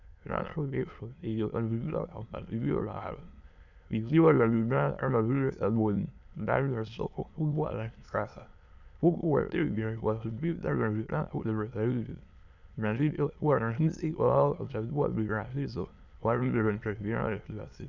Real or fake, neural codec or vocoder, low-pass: fake; autoencoder, 22.05 kHz, a latent of 192 numbers a frame, VITS, trained on many speakers; 7.2 kHz